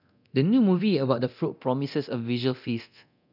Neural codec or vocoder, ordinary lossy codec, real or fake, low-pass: codec, 24 kHz, 0.9 kbps, DualCodec; none; fake; 5.4 kHz